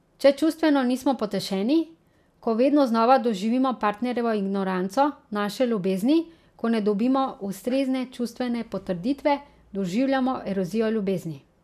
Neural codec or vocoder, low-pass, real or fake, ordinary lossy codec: none; 14.4 kHz; real; none